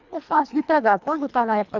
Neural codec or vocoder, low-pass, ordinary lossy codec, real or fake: codec, 24 kHz, 1.5 kbps, HILCodec; 7.2 kHz; none; fake